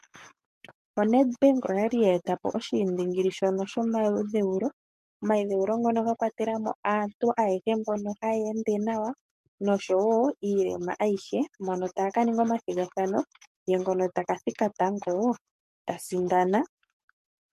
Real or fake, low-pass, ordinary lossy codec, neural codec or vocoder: fake; 14.4 kHz; MP3, 64 kbps; codec, 44.1 kHz, 7.8 kbps, DAC